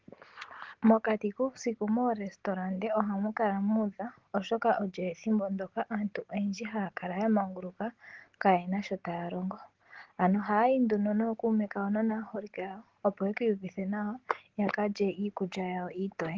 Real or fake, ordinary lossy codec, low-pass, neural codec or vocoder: real; Opus, 16 kbps; 7.2 kHz; none